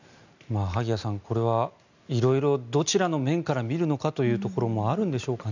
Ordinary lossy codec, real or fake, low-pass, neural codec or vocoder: none; real; 7.2 kHz; none